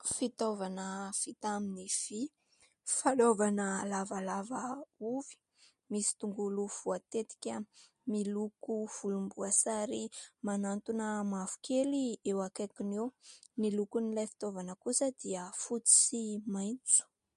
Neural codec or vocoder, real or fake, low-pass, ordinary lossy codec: none; real; 14.4 kHz; MP3, 48 kbps